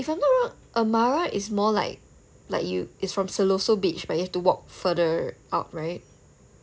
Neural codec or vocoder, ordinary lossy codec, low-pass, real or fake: none; none; none; real